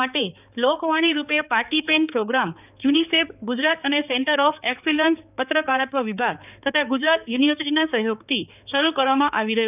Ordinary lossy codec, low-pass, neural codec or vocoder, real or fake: none; 3.6 kHz; codec, 16 kHz, 4 kbps, X-Codec, HuBERT features, trained on balanced general audio; fake